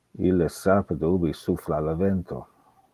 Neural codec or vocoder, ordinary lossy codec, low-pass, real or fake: none; Opus, 24 kbps; 14.4 kHz; real